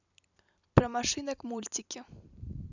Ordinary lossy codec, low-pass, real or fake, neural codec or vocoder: none; 7.2 kHz; fake; vocoder, 44.1 kHz, 128 mel bands every 256 samples, BigVGAN v2